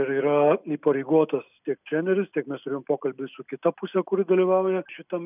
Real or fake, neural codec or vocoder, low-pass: real; none; 3.6 kHz